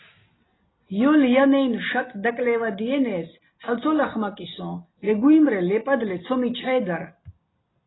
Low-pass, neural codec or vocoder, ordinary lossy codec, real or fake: 7.2 kHz; none; AAC, 16 kbps; real